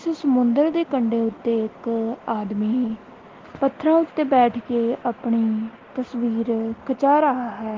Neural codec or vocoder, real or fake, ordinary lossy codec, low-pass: none; real; Opus, 16 kbps; 7.2 kHz